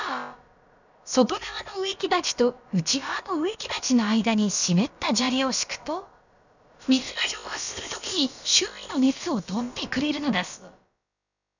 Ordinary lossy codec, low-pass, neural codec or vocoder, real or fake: none; 7.2 kHz; codec, 16 kHz, about 1 kbps, DyCAST, with the encoder's durations; fake